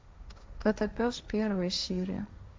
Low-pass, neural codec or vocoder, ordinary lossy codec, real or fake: none; codec, 16 kHz, 1.1 kbps, Voila-Tokenizer; none; fake